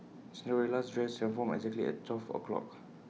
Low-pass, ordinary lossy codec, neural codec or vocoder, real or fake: none; none; none; real